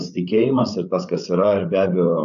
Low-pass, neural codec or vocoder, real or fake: 7.2 kHz; codec, 16 kHz, 16 kbps, FreqCodec, larger model; fake